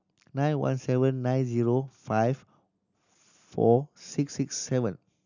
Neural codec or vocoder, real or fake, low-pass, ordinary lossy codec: none; real; 7.2 kHz; none